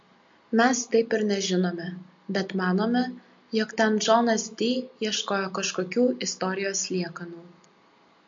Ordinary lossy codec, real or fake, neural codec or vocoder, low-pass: MP3, 48 kbps; real; none; 7.2 kHz